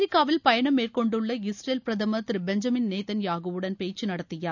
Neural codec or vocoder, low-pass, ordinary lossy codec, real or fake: none; 7.2 kHz; none; real